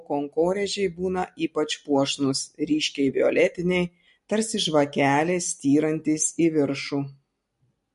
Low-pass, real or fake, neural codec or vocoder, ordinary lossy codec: 14.4 kHz; real; none; MP3, 48 kbps